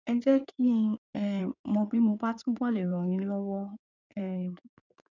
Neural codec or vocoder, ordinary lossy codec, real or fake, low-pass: codec, 16 kHz in and 24 kHz out, 2.2 kbps, FireRedTTS-2 codec; none; fake; 7.2 kHz